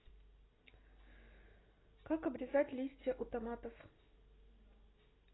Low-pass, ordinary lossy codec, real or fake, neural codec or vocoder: 7.2 kHz; AAC, 16 kbps; real; none